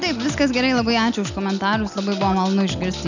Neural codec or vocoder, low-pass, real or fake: none; 7.2 kHz; real